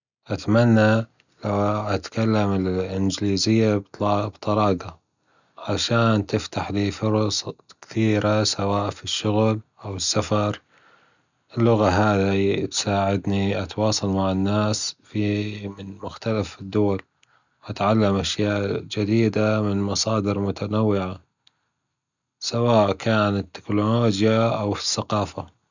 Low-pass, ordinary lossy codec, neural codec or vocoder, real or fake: 7.2 kHz; none; none; real